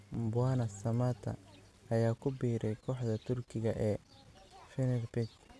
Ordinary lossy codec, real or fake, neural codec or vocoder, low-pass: none; real; none; none